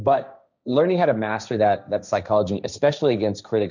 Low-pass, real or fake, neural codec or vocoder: 7.2 kHz; fake; codec, 16 kHz, 1.1 kbps, Voila-Tokenizer